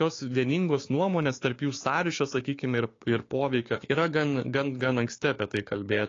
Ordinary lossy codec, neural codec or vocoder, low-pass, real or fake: AAC, 32 kbps; codec, 16 kHz, 6 kbps, DAC; 7.2 kHz; fake